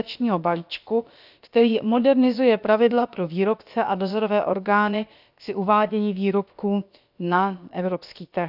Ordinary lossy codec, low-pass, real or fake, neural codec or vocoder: none; 5.4 kHz; fake; codec, 16 kHz, about 1 kbps, DyCAST, with the encoder's durations